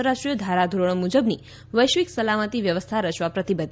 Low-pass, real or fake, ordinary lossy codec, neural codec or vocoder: none; real; none; none